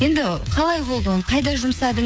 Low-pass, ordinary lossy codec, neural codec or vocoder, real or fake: none; none; codec, 16 kHz, 8 kbps, FreqCodec, smaller model; fake